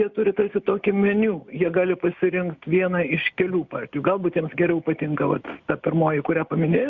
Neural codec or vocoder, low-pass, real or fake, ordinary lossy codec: none; 7.2 kHz; real; Opus, 64 kbps